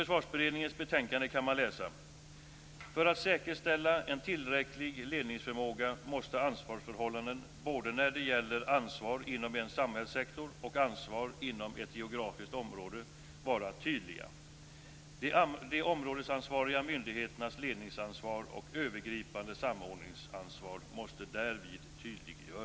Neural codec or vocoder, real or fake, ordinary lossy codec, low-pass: none; real; none; none